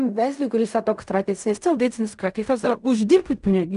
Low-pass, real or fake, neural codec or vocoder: 10.8 kHz; fake; codec, 16 kHz in and 24 kHz out, 0.4 kbps, LongCat-Audio-Codec, fine tuned four codebook decoder